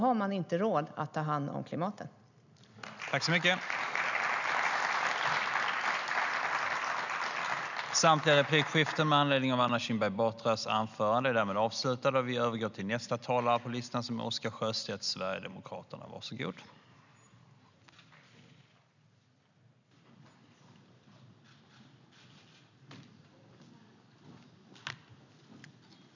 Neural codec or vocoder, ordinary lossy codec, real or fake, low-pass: none; none; real; 7.2 kHz